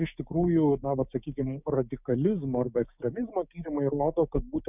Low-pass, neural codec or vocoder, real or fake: 3.6 kHz; codec, 16 kHz, 6 kbps, DAC; fake